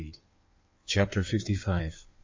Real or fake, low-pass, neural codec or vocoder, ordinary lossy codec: fake; 7.2 kHz; codec, 16 kHz in and 24 kHz out, 1.1 kbps, FireRedTTS-2 codec; MP3, 64 kbps